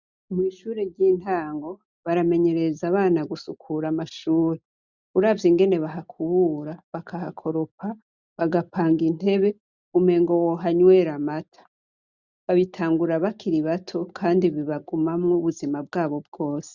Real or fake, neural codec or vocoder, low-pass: real; none; 7.2 kHz